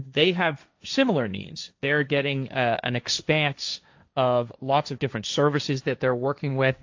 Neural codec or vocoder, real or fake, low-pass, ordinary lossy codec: codec, 16 kHz, 1.1 kbps, Voila-Tokenizer; fake; 7.2 kHz; AAC, 48 kbps